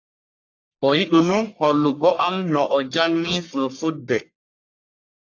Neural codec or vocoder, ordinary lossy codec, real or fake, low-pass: codec, 44.1 kHz, 1.7 kbps, Pupu-Codec; AAC, 48 kbps; fake; 7.2 kHz